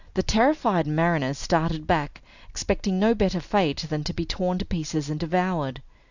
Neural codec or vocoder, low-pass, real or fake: none; 7.2 kHz; real